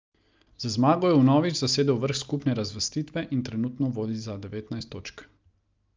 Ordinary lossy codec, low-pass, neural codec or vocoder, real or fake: Opus, 24 kbps; 7.2 kHz; none; real